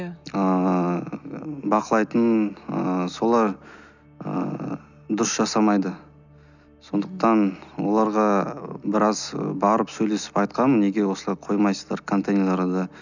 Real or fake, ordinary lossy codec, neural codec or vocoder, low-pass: real; none; none; 7.2 kHz